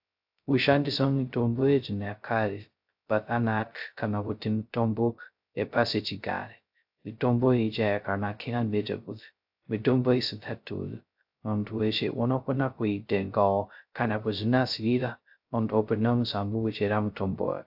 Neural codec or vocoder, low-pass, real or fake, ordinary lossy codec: codec, 16 kHz, 0.2 kbps, FocalCodec; 5.4 kHz; fake; AAC, 48 kbps